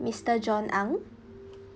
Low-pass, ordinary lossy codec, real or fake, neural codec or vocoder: none; none; real; none